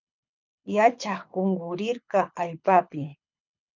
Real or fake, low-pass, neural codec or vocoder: fake; 7.2 kHz; codec, 24 kHz, 6 kbps, HILCodec